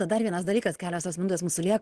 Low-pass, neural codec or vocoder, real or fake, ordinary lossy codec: 10.8 kHz; none; real; Opus, 16 kbps